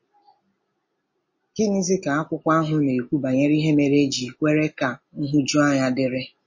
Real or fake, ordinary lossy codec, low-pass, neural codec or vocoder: real; MP3, 32 kbps; 7.2 kHz; none